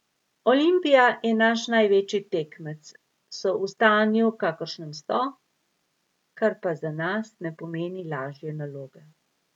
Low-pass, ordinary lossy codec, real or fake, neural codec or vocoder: 19.8 kHz; none; real; none